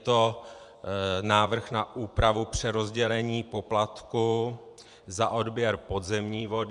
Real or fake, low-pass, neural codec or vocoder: fake; 10.8 kHz; vocoder, 24 kHz, 100 mel bands, Vocos